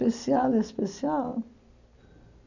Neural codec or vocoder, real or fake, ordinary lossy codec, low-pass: none; real; none; 7.2 kHz